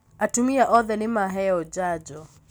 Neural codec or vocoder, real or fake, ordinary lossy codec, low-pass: none; real; none; none